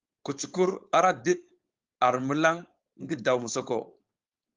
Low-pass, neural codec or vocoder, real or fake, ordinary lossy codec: 7.2 kHz; codec, 16 kHz, 4.8 kbps, FACodec; fake; Opus, 32 kbps